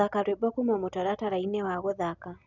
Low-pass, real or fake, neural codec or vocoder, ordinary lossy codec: 7.2 kHz; real; none; none